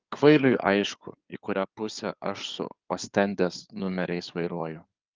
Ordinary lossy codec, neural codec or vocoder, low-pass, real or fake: Opus, 24 kbps; codec, 16 kHz in and 24 kHz out, 2.2 kbps, FireRedTTS-2 codec; 7.2 kHz; fake